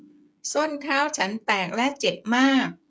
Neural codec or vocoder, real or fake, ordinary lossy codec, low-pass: codec, 16 kHz, 16 kbps, FreqCodec, smaller model; fake; none; none